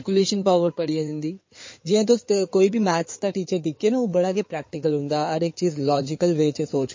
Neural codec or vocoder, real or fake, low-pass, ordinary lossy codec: codec, 16 kHz in and 24 kHz out, 2.2 kbps, FireRedTTS-2 codec; fake; 7.2 kHz; MP3, 32 kbps